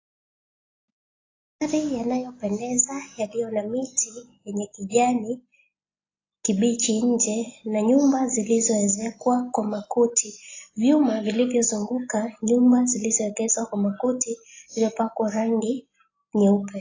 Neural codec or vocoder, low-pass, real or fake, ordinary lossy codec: none; 7.2 kHz; real; AAC, 32 kbps